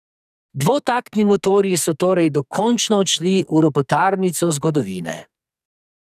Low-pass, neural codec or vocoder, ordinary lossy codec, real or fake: 14.4 kHz; codec, 44.1 kHz, 2.6 kbps, SNAC; none; fake